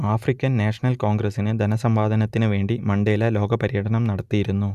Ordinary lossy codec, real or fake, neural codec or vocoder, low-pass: none; real; none; 14.4 kHz